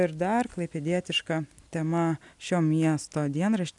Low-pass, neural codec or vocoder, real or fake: 10.8 kHz; none; real